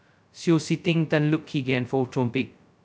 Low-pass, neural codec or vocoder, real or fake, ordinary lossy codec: none; codec, 16 kHz, 0.2 kbps, FocalCodec; fake; none